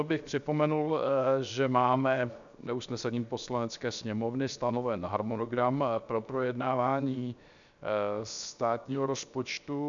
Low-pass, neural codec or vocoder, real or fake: 7.2 kHz; codec, 16 kHz, 0.7 kbps, FocalCodec; fake